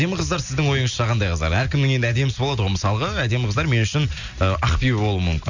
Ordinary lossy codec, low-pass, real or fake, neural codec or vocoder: none; 7.2 kHz; real; none